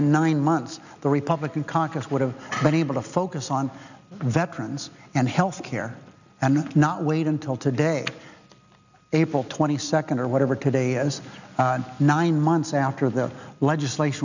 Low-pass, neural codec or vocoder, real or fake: 7.2 kHz; none; real